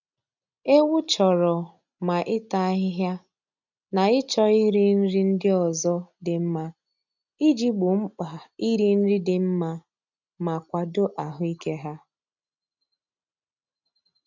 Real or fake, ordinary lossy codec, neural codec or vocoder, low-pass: real; none; none; 7.2 kHz